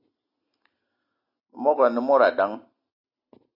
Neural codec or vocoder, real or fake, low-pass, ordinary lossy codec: none; real; 5.4 kHz; AAC, 32 kbps